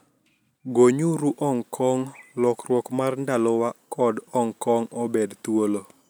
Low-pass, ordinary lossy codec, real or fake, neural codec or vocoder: none; none; real; none